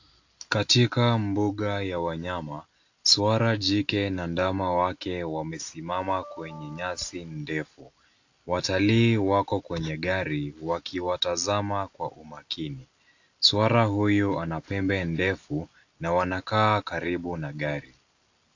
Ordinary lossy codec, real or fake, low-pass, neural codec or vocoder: AAC, 48 kbps; real; 7.2 kHz; none